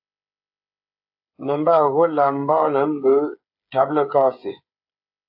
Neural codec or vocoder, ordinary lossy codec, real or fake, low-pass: codec, 16 kHz, 8 kbps, FreqCodec, smaller model; AAC, 48 kbps; fake; 5.4 kHz